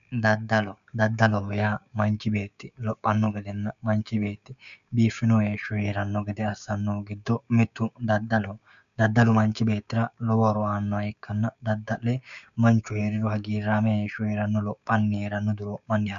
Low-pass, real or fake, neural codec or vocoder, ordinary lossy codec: 7.2 kHz; fake; codec, 16 kHz, 6 kbps, DAC; MP3, 96 kbps